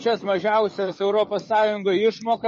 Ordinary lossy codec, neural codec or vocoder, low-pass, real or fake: MP3, 32 kbps; codec, 44.1 kHz, 7.8 kbps, DAC; 10.8 kHz; fake